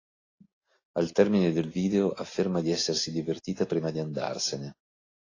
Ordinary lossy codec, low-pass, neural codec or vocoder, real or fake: AAC, 32 kbps; 7.2 kHz; none; real